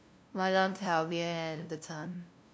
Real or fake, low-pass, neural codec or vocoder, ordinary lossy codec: fake; none; codec, 16 kHz, 0.5 kbps, FunCodec, trained on LibriTTS, 25 frames a second; none